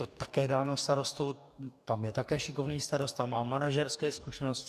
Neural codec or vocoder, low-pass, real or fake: codec, 44.1 kHz, 2.6 kbps, DAC; 14.4 kHz; fake